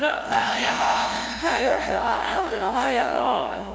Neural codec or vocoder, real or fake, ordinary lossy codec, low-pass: codec, 16 kHz, 0.5 kbps, FunCodec, trained on LibriTTS, 25 frames a second; fake; none; none